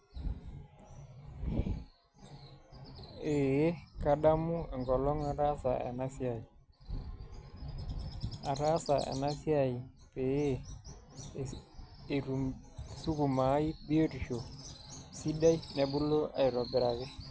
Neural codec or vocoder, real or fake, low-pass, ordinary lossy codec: none; real; none; none